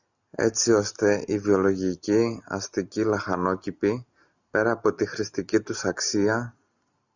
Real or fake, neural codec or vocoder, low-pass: real; none; 7.2 kHz